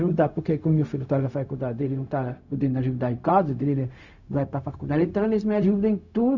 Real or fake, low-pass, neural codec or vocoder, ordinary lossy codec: fake; 7.2 kHz; codec, 16 kHz, 0.4 kbps, LongCat-Audio-Codec; none